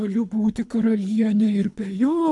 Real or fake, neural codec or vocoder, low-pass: fake; codec, 24 kHz, 3 kbps, HILCodec; 10.8 kHz